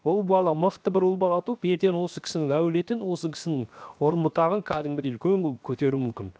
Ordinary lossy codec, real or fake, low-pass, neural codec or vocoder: none; fake; none; codec, 16 kHz, 0.7 kbps, FocalCodec